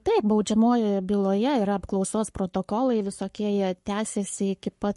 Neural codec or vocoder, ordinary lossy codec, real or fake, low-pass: codec, 44.1 kHz, 7.8 kbps, Pupu-Codec; MP3, 48 kbps; fake; 14.4 kHz